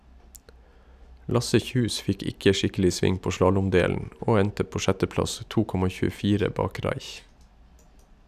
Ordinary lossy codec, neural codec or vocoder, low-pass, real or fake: none; none; 14.4 kHz; real